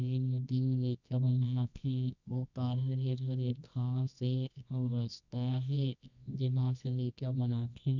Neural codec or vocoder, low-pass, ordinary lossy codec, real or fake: codec, 24 kHz, 0.9 kbps, WavTokenizer, medium music audio release; 7.2 kHz; none; fake